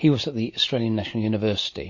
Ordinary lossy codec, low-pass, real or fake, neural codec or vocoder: MP3, 32 kbps; 7.2 kHz; real; none